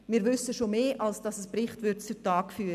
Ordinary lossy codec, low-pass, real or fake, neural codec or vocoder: none; 14.4 kHz; real; none